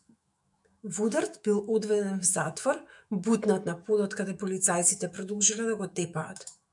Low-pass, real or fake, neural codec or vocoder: 10.8 kHz; fake; autoencoder, 48 kHz, 128 numbers a frame, DAC-VAE, trained on Japanese speech